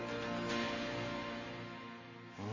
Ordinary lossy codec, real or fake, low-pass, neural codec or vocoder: MP3, 32 kbps; real; 7.2 kHz; none